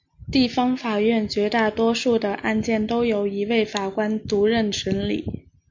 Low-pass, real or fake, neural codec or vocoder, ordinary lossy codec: 7.2 kHz; real; none; MP3, 48 kbps